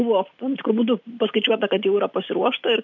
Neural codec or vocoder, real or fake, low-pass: none; real; 7.2 kHz